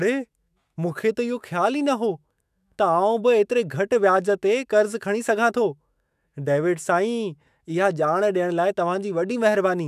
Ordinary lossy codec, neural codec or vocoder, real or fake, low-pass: none; autoencoder, 48 kHz, 128 numbers a frame, DAC-VAE, trained on Japanese speech; fake; 14.4 kHz